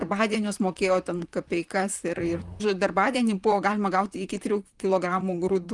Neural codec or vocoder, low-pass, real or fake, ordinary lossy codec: vocoder, 44.1 kHz, 128 mel bands, Pupu-Vocoder; 10.8 kHz; fake; Opus, 32 kbps